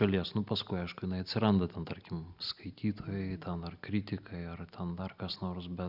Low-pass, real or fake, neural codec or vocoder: 5.4 kHz; real; none